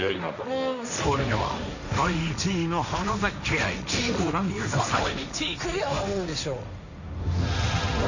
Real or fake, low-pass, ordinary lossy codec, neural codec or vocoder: fake; 7.2 kHz; none; codec, 16 kHz, 1.1 kbps, Voila-Tokenizer